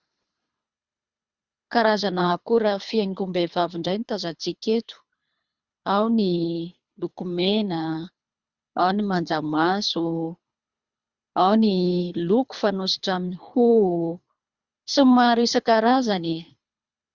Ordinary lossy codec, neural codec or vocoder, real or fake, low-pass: Opus, 64 kbps; codec, 24 kHz, 3 kbps, HILCodec; fake; 7.2 kHz